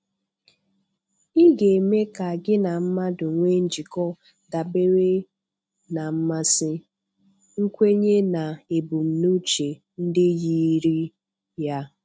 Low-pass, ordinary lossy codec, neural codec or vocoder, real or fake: none; none; none; real